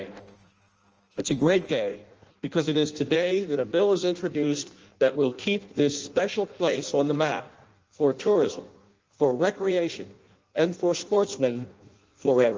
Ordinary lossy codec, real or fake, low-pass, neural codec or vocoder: Opus, 24 kbps; fake; 7.2 kHz; codec, 16 kHz in and 24 kHz out, 0.6 kbps, FireRedTTS-2 codec